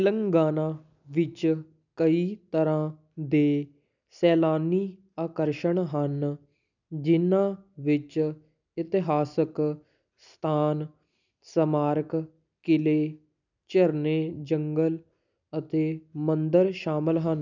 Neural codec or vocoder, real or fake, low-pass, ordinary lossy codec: none; real; 7.2 kHz; none